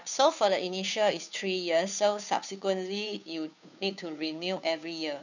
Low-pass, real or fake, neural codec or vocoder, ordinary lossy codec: 7.2 kHz; fake; codec, 16 kHz, 2 kbps, FunCodec, trained on Chinese and English, 25 frames a second; none